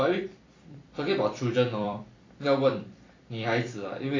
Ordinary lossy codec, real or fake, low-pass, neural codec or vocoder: AAC, 32 kbps; fake; 7.2 kHz; vocoder, 44.1 kHz, 128 mel bands every 256 samples, BigVGAN v2